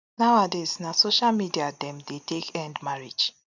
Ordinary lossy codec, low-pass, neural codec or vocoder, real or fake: none; 7.2 kHz; none; real